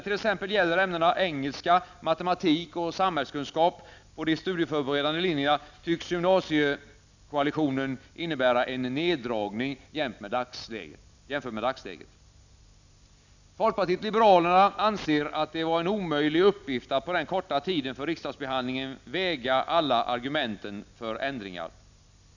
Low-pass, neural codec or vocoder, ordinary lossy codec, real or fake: 7.2 kHz; none; none; real